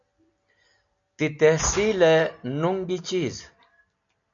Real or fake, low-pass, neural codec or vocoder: real; 7.2 kHz; none